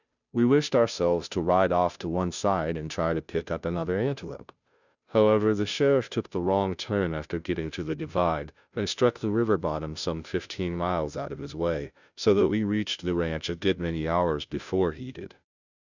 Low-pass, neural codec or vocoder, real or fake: 7.2 kHz; codec, 16 kHz, 0.5 kbps, FunCodec, trained on Chinese and English, 25 frames a second; fake